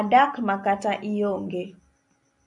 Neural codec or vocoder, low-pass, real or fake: none; 10.8 kHz; real